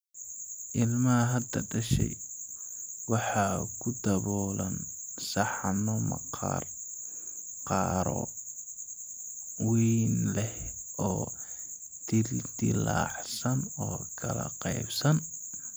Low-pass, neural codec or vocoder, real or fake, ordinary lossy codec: none; none; real; none